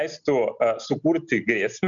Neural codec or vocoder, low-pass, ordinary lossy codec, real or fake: none; 7.2 kHz; MP3, 96 kbps; real